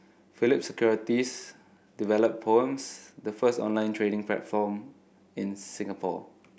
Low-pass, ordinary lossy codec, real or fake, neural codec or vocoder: none; none; real; none